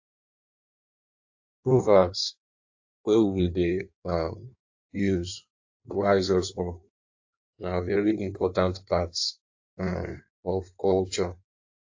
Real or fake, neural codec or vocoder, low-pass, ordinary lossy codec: fake; codec, 16 kHz in and 24 kHz out, 1.1 kbps, FireRedTTS-2 codec; 7.2 kHz; AAC, 48 kbps